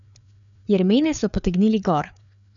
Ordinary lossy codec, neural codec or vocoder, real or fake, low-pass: none; codec, 16 kHz, 4 kbps, FreqCodec, larger model; fake; 7.2 kHz